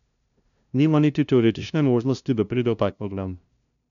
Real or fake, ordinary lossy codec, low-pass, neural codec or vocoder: fake; none; 7.2 kHz; codec, 16 kHz, 0.5 kbps, FunCodec, trained on LibriTTS, 25 frames a second